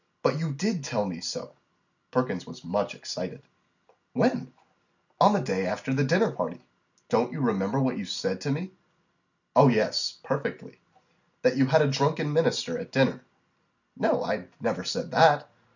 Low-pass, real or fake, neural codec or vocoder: 7.2 kHz; real; none